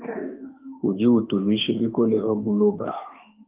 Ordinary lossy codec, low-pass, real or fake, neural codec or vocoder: Opus, 32 kbps; 3.6 kHz; fake; autoencoder, 48 kHz, 32 numbers a frame, DAC-VAE, trained on Japanese speech